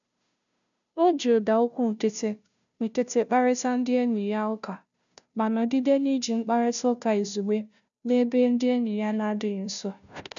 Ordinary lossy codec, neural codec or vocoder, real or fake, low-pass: none; codec, 16 kHz, 0.5 kbps, FunCodec, trained on Chinese and English, 25 frames a second; fake; 7.2 kHz